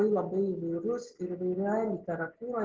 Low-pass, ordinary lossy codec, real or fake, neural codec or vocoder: 7.2 kHz; Opus, 16 kbps; real; none